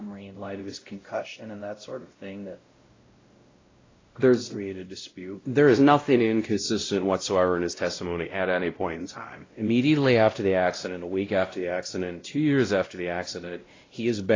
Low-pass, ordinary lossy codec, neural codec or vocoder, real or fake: 7.2 kHz; AAC, 32 kbps; codec, 16 kHz, 0.5 kbps, X-Codec, WavLM features, trained on Multilingual LibriSpeech; fake